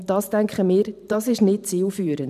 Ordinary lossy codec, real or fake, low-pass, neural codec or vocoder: none; fake; 14.4 kHz; vocoder, 44.1 kHz, 128 mel bands every 512 samples, BigVGAN v2